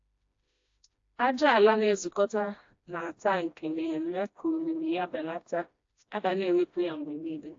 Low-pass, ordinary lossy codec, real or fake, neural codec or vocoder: 7.2 kHz; AAC, 48 kbps; fake; codec, 16 kHz, 1 kbps, FreqCodec, smaller model